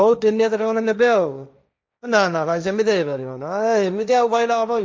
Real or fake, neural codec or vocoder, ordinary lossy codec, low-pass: fake; codec, 16 kHz, 1.1 kbps, Voila-Tokenizer; none; none